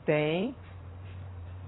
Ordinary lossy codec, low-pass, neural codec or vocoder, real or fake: AAC, 16 kbps; 7.2 kHz; vocoder, 44.1 kHz, 128 mel bands every 512 samples, BigVGAN v2; fake